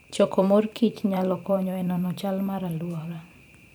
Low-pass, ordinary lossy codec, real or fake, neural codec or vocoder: none; none; fake; vocoder, 44.1 kHz, 128 mel bands every 512 samples, BigVGAN v2